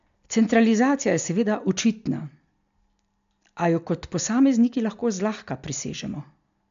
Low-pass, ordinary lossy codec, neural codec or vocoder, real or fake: 7.2 kHz; MP3, 64 kbps; none; real